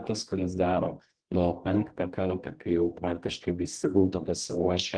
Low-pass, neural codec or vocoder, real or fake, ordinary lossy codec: 9.9 kHz; codec, 24 kHz, 0.9 kbps, WavTokenizer, medium music audio release; fake; Opus, 16 kbps